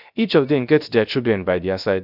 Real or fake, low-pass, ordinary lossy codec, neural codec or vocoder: fake; 5.4 kHz; none; codec, 16 kHz, 0.3 kbps, FocalCodec